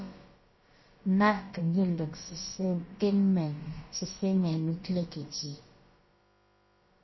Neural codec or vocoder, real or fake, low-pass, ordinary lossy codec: codec, 16 kHz, about 1 kbps, DyCAST, with the encoder's durations; fake; 7.2 kHz; MP3, 24 kbps